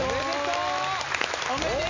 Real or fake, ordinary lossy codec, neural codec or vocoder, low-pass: real; MP3, 64 kbps; none; 7.2 kHz